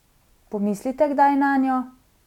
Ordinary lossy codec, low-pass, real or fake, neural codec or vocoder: none; 19.8 kHz; real; none